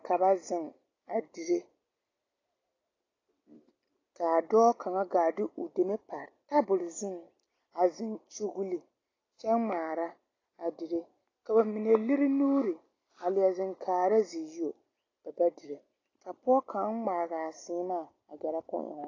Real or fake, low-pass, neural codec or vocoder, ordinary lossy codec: real; 7.2 kHz; none; AAC, 32 kbps